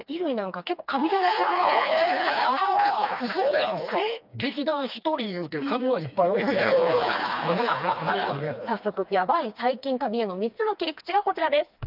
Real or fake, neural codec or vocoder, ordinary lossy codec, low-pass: fake; codec, 16 kHz, 2 kbps, FreqCodec, smaller model; none; 5.4 kHz